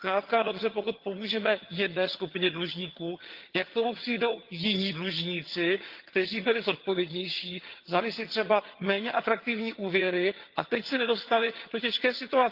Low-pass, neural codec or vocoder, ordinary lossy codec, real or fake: 5.4 kHz; vocoder, 22.05 kHz, 80 mel bands, HiFi-GAN; Opus, 32 kbps; fake